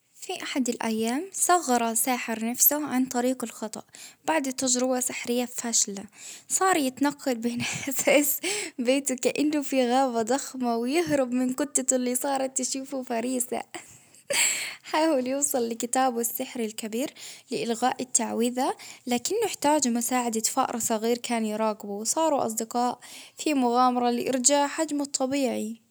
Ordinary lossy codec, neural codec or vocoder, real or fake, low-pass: none; none; real; none